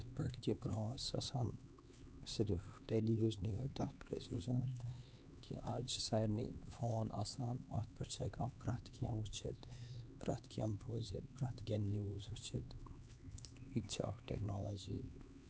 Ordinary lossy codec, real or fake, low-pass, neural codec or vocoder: none; fake; none; codec, 16 kHz, 2 kbps, X-Codec, HuBERT features, trained on LibriSpeech